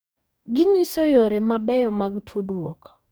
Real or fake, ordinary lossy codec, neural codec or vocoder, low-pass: fake; none; codec, 44.1 kHz, 2.6 kbps, DAC; none